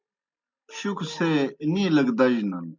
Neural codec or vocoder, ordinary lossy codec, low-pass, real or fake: none; MP3, 64 kbps; 7.2 kHz; real